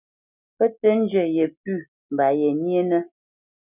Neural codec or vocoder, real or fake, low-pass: none; real; 3.6 kHz